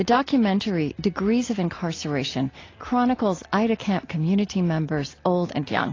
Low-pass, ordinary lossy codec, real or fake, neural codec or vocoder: 7.2 kHz; AAC, 32 kbps; real; none